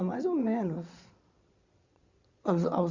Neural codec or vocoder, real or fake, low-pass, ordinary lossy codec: vocoder, 22.05 kHz, 80 mel bands, Vocos; fake; 7.2 kHz; Opus, 64 kbps